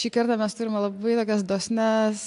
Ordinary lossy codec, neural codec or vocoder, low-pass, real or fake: AAC, 64 kbps; none; 10.8 kHz; real